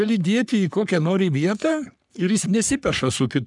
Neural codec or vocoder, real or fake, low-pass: codec, 44.1 kHz, 3.4 kbps, Pupu-Codec; fake; 10.8 kHz